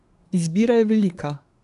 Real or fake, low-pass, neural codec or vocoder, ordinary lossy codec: fake; 10.8 kHz; codec, 24 kHz, 1 kbps, SNAC; none